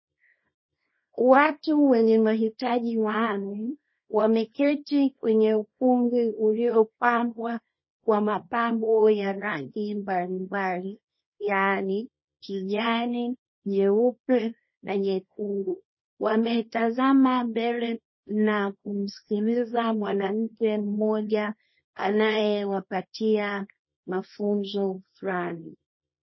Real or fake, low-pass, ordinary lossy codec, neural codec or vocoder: fake; 7.2 kHz; MP3, 24 kbps; codec, 24 kHz, 0.9 kbps, WavTokenizer, small release